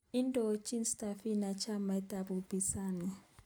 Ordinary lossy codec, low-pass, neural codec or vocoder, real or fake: none; none; none; real